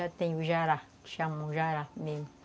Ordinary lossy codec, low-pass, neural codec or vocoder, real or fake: none; none; none; real